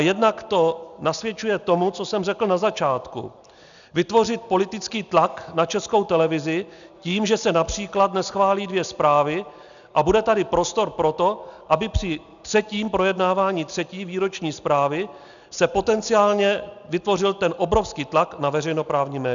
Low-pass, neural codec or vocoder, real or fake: 7.2 kHz; none; real